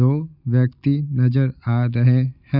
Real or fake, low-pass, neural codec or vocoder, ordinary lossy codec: real; 5.4 kHz; none; Opus, 64 kbps